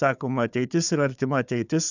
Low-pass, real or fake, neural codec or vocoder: 7.2 kHz; fake; codec, 16 kHz, 4 kbps, FunCodec, trained on Chinese and English, 50 frames a second